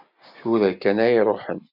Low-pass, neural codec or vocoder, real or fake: 5.4 kHz; none; real